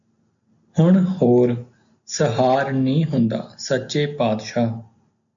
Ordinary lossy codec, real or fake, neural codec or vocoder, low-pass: MP3, 64 kbps; real; none; 7.2 kHz